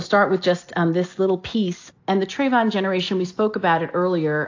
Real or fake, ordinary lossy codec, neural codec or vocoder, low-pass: real; AAC, 48 kbps; none; 7.2 kHz